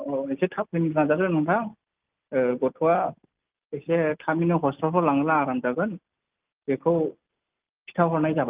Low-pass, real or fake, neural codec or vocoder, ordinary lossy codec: 3.6 kHz; real; none; Opus, 24 kbps